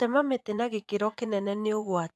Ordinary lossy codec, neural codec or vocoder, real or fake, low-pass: none; vocoder, 24 kHz, 100 mel bands, Vocos; fake; none